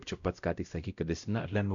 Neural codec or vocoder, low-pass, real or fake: codec, 16 kHz, 0.5 kbps, X-Codec, WavLM features, trained on Multilingual LibriSpeech; 7.2 kHz; fake